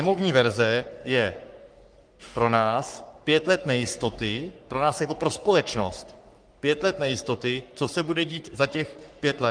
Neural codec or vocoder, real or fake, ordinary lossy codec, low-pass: codec, 44.1 kHz, 3.4 kbps, Pupu-Codec; fake; Opus, 32 kbps; 9.9 kHz